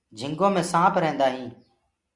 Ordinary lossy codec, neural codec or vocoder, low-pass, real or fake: Opus, 64 kbps; none; 10.8 kHz; real